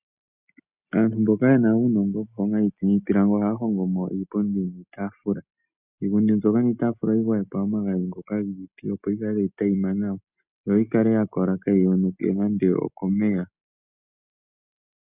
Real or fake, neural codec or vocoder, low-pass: real; none; 3.6 kHz